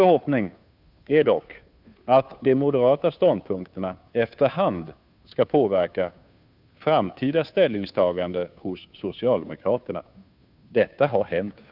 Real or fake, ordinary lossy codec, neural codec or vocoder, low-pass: fake; none; codec, 16 kHz, 2 kbps, FunCodec, trained on Chinese and English, 25 frames a second; 5.4 kHz